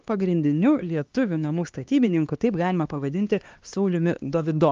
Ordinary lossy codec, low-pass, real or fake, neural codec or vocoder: Opus, 24 kbps; 7.2 kHz; fake; codec, 16 kHz, 2 kbps, X-Codec, HuBERT features, trained on LibriSpeech